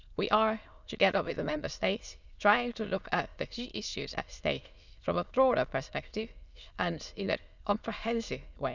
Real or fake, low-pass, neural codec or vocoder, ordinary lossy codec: fake; 7.2 kHz; autoencoder, 22.05 kHz, a latent of 192 numbers a frame, VITS, trained on many speakers; none